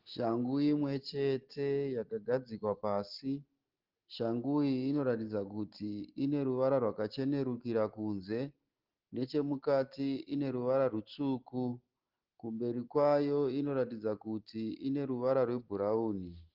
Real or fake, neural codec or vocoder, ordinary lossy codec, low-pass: real; none; Opus, 16 kbps; 5.4 kHz